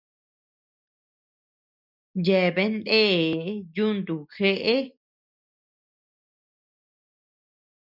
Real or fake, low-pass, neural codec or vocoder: real; 5.4 kHz; none